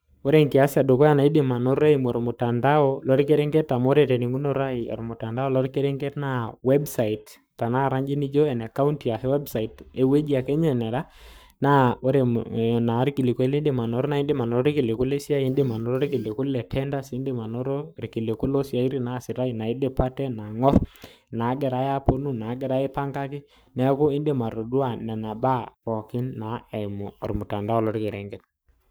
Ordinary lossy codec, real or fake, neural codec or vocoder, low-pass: none; fake; codec, 44.1 kHz, 7.8 kbps, Pupu-Codec; none